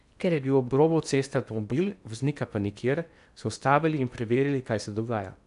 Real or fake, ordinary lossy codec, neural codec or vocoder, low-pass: fake; none; codec, 16 kHz in and 24 kHz out, 0.8 kbps, FocalCodec, streaming, 65536 codes; 10.8 kHz